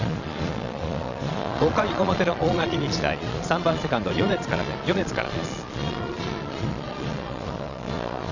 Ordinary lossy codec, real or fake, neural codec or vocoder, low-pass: none; fake; vocoder, 22.05 kHz, 80 mel bands, Vocos; 7.2 kHz